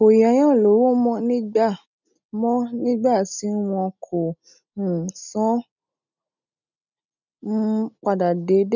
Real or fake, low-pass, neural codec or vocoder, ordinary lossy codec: real; 7.2 kHz; none; none